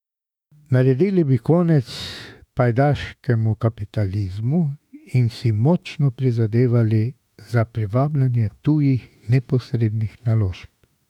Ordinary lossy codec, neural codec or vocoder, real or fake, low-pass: none; autoencoder, 48 kHz, 32 numbers a frame, DAC-VAE, trained on Japanese speech; fake; 19.8 kHz